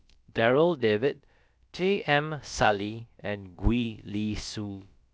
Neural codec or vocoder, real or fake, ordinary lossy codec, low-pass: codec, 16 kHz, about 1 kbps, DyCAST, with the encoder's durations; fake; none; none